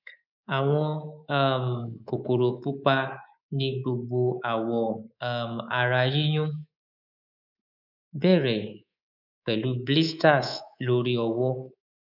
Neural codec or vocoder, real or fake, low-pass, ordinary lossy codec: codec, 24 kHz, 3.1 kbps, DualCodec; fake; 5.4 kHz; none